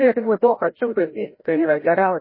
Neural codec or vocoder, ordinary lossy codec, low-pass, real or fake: codec, 16 kHz, 0.5 kbps, FreqCodec, larger model; MP3, 24 kbps; 5.4 kHz; fake